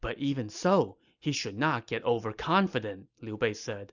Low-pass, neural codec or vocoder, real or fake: 7.2 kHz; none; real